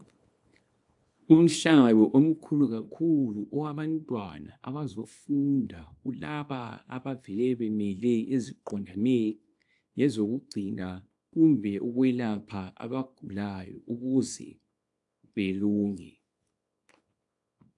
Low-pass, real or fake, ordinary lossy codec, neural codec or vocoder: 10.8 kHz; fake; AAC, 64 kbps; codec, 24 kHz, 0.9 kbps, WavTokenizer, small release